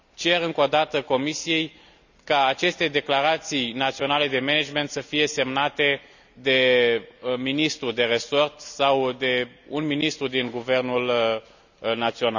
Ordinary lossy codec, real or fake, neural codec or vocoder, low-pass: none; real; none; 7.2 kHz